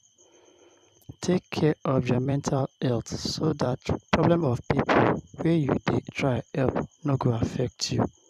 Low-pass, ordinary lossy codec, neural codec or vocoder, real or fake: 14.4 kHz; none; vocoder, 44.1 kHz, 128 mel bands every 256 samples, BigVGAN v2; fake